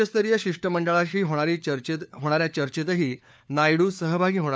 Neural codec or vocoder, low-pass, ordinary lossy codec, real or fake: codec, 16 kHz, 4 kbps, FunCodec, trained on LibriTTS, 50 frames a second; none; none; fake